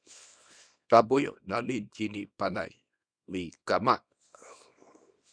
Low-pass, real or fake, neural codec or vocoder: 9.9 kHz; fake; codec, 24 kHz, 0.9 kbps, WavTokenizer, small release